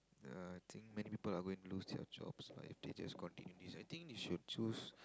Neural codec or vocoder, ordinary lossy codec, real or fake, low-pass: none; none; real; none